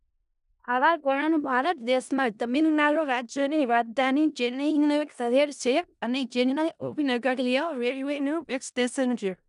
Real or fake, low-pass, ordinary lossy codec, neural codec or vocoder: fake; 10.8 kHz; none; codec, 16 kHz in and 24 kHz out, 0.4 kbps, LongCat-Audio-Codec, four codebook decoder